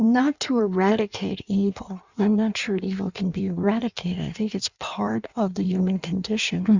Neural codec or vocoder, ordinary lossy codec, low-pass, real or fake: codec, 16 kHz in and 24 kHz out, 1.1 kbps, FireRedTTS-2 codec; Opus, 64 kbps; 7.2 kHz; fake